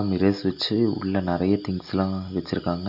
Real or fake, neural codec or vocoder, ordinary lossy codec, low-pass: real; none; none; 5.4 kHz